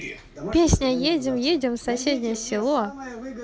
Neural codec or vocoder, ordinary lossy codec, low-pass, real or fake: none; none; none; real